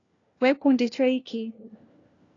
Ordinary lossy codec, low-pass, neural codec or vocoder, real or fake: AAC, 32 kbps; 7.2 kHz; codec, 16 kHz, 1 kbps, FunCodec, trained on LibriTTS, 50 frames a second; fake